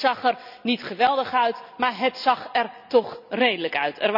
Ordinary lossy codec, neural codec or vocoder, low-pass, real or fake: none; none; 5.4 kHz; real